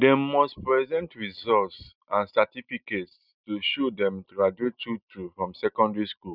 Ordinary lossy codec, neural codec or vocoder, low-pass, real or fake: none; none; 5.4 kHz; real